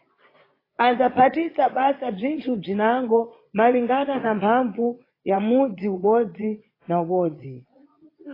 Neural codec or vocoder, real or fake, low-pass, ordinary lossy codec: vocoder, 22.05 kHz, 80 mel bands, Vocos; fake; 5.4 kHz; AAC, 24 kbps